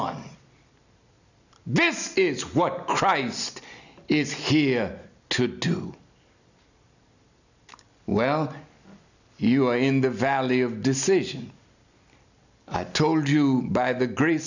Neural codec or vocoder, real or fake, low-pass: none; real; 7.2 kHz